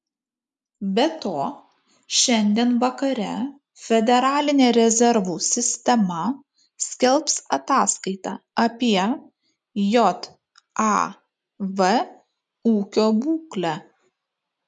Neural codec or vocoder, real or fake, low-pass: none; real; 10.8 kHz